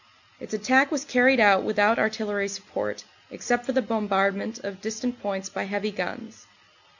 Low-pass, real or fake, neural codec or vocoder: 7.2 kHz; real; none